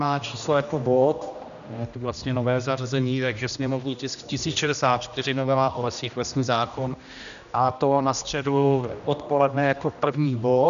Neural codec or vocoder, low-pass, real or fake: codec, 16 kHz, 1 kbps, X-Codec, HuBERT features, trained on general audio; 7.2 kHz; fake